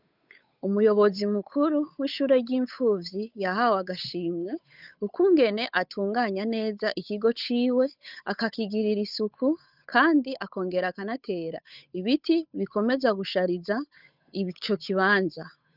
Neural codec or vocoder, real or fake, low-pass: codec, 16 kHz, 8 kbps, FunCodec, trained on Chinese and English, 25 frames a second; fake; 5.4 kHz